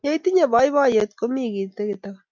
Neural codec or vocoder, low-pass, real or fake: none; 7.2 kHz; real